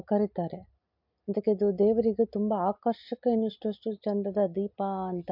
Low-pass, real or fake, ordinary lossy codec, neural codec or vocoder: 5.4 kHz; real; MP3, 48 kbps; none